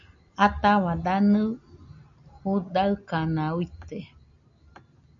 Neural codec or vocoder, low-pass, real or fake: none; 7.2 kHz; real